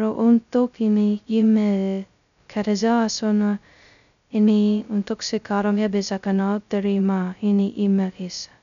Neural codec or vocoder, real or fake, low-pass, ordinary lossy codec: codec, 16 kHz, 0.2 kbps, FocalCodec; fake; 7.2 kHz; none